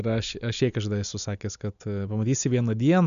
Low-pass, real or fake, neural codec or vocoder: 7.2 kHz; real; none